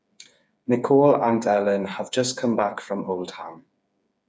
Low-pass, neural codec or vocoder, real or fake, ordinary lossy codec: none; codec, 16 kHz, 8 kbps, FreqCodec, smaller model; fake; none